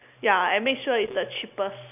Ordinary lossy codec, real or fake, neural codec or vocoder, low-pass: none; real; none; 3.6 kHz